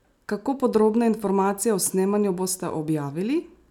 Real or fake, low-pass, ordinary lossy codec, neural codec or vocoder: real; 19.8 kHz; none; none